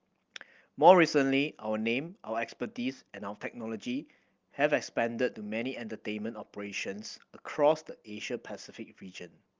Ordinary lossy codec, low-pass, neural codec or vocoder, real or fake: Opus, 32 kbps; 7.2 kHz; none; real